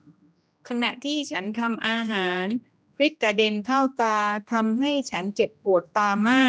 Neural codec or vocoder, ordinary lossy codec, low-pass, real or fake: codec, 16 kHz, 1 kbps, X-Codec, HuBERT features, trained on general audio; none; none; fake